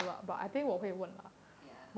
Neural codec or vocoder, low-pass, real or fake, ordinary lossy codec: none; none; real; none